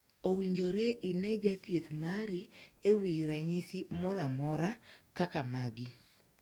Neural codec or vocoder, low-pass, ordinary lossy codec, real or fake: codec, 44.1 kHz, 2.6 kbps, DAC; 19.8 kHz; none; fake